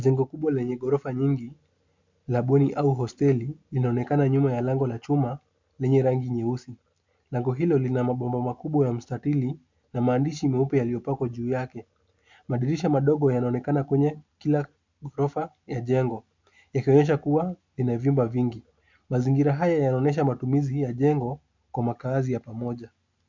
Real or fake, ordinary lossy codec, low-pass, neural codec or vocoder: real; MP3, 64 kbps; 7.2 kHz; none